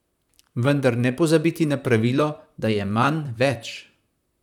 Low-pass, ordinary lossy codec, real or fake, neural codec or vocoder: 19.8 kHz; none; fake; vocoder, 44.1 kHz, 128 mel bands, Pupu-Vocoder